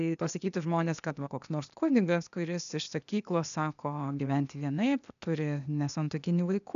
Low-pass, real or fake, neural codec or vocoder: 7.2 kHz; fake; codec, 16 kHz, 0.8 kbps, ZipCodec